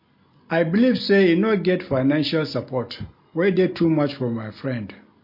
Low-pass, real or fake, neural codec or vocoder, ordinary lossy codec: 5.4 kHz; real; none; MP3, 32 kbps